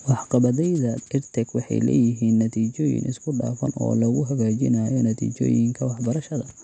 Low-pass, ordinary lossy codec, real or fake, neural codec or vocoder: 9.9 kHz; none; real; none